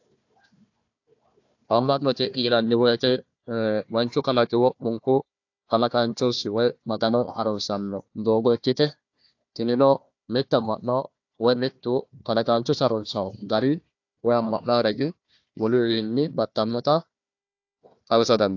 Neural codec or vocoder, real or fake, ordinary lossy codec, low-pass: codec, 16 kHz, 1 kbps, FunCodec, trained on Chinese and English, 50 frames a second; fake; AAC, 48 kbps; 7.2 kHz